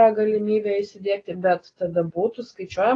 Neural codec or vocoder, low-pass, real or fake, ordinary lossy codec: none; 9.9 kHz; real; AAC, 32 kbps